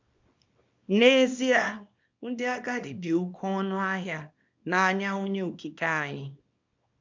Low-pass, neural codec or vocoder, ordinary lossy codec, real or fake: 7.2 kHz; codec, 24 kHz, 0.9 kbps, WavTokenizer, small release; MP3, 64 kbps; fake